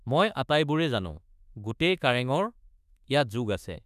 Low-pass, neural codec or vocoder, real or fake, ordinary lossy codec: 14.4 kHz; autoencoder, 48 kHz, 32 numbers a frame, DAC-VAE, trained on Japanese speech; fake; none